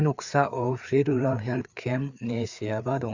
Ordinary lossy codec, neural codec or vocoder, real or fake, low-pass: Opus, 64 kbps; codec, 16 kHz, 4 kbps, FreqCodec, larger model; fake; 7.2 kHz